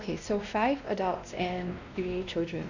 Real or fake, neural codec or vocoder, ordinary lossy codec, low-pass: fake; codec, 16 kHz, 1 kbps, X-Codec, WavLM features, trained on Multilingual LibriSpeech; none; 7.2 kHz